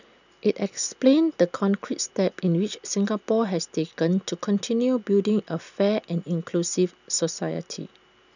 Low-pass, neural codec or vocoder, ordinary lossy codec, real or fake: 7.2 kHz; none; none; real